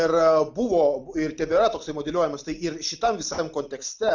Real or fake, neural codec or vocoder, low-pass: real; none; 7.2 kHz